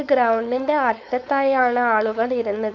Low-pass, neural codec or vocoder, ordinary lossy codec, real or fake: 7.2 kHz; codec, 16 kHz, 4.8 kbps, FACodec; none; fake